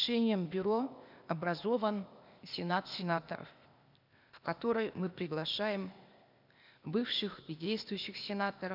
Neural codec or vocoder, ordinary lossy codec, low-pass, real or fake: codec, 16 kHz, 0.8 kbps, ZipCodec; none; 5.4 kHz; fake